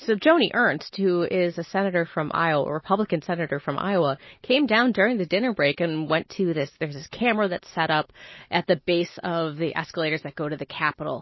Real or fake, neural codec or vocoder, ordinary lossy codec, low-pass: real; none; MP3, 24 kbps; 7.2 kHz